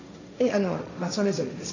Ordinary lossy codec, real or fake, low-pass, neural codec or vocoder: none; fake; 7.2 kHz; codec, 16 kHz, 1.1 kbps, Voila-Tokenizer